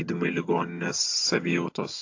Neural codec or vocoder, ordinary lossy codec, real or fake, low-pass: vocoder, 22.05 kHz, 80 mel bands, HiFi-GAN; AAC, 48 kbps; fake; 7.2 kHz